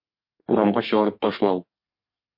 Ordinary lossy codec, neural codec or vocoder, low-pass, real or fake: MP3, 32 kbps; codec, 32 kHz, 1.9 kbps, SNAC; 5.4 kHz; fake